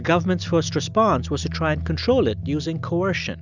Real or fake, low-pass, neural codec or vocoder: real; 7.2 kHz; none